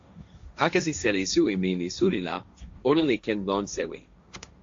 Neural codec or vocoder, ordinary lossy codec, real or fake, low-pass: codec, 16 kHz, 1.1 kbps, Voila-Tokenizer; AAC, 48 kbps; fake; 7.2 kHz